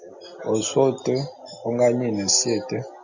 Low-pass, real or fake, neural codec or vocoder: 7.2 kHz; real; none